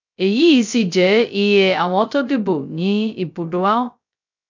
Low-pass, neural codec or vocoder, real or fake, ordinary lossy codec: 7.2 kHz; codec, 16 kHz, 0.2 kbps, FocalCodec; fake; none